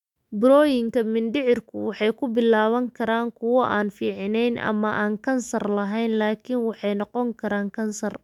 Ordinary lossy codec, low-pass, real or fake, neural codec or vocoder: MP3, 96 kbps; 19.8 kHz; fake; autoencoder, 48 kHz, 128 numbers a frame, DAC-VAE, trained on Japanese speech